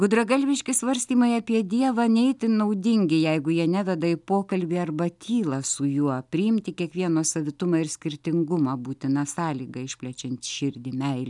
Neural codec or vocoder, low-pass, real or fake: none; 10.8 kHz; real